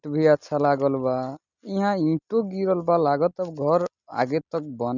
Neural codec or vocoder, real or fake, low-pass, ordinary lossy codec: none; real; 7.2 kHz; none